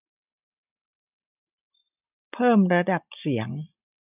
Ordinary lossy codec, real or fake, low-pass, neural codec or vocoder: none; real; 3.6 kHz; none